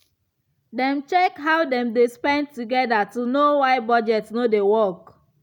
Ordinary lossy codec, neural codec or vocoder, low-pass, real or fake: none; none; 19.8 kHz; real